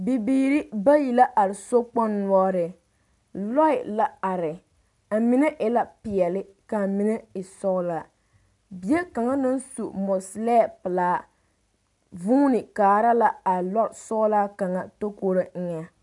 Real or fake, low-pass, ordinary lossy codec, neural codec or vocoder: real; 10.8 kHz; MP3, 96 kbps; none